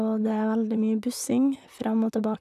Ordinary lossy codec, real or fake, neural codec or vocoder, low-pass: none; fake; vocoder, 44.1 kHz, 128 mel bands, Pupu-Vocoder; 14.4 kHz